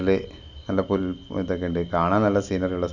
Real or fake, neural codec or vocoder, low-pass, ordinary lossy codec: real; none; 7.2 kHz; none